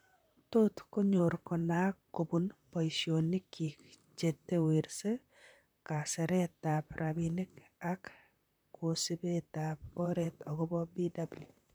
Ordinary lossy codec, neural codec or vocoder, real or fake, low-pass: none; vocoder, 44.1 kHz, 128 mel bands, Pupu-Vocoder; fake; none